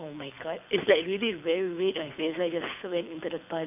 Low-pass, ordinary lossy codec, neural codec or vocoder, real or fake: 3.6 kHz; none; codec, 24 kHz, 6 kbps, HILCodec; fake